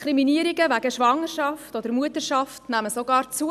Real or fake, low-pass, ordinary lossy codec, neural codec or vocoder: real; 14.4 kHz; none; none